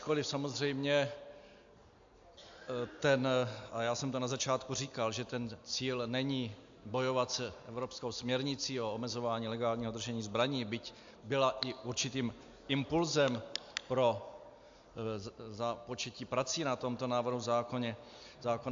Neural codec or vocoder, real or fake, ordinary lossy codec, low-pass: none; real; AAC, 64 kbps; 7.2 kHz